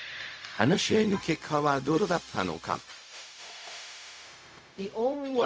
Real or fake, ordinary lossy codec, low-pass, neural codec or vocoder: fake; none; none; codec, 16 kHz, 0.4 kbps, LongCat-Audio-Codec